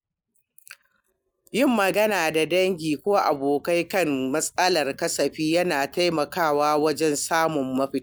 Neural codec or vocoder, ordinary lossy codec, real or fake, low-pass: none; none; real; none